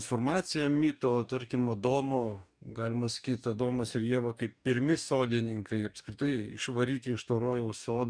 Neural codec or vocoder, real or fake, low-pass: codec, 44.1 kHz, 2.6 kbps, DAC; fake; 9.9 kHz